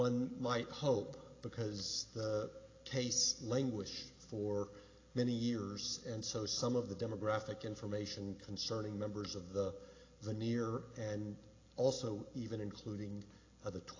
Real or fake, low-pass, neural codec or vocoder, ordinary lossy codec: real; 7.2 kHz; none; AAC, 32 kbps